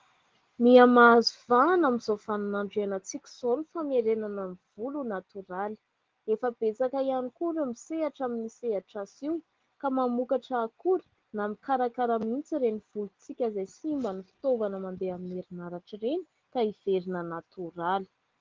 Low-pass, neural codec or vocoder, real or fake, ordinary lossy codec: 7.2 kHz; none; real; Opus, 16 kbps